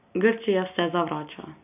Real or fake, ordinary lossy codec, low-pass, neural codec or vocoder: real; none; 3.6 kHz; none